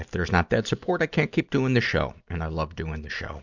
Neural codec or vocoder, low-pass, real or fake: none; 7.2 kHz; real